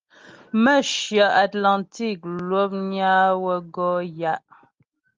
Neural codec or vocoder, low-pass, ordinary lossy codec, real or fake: none; 7.2 kHz; Opus, 32 kbps; real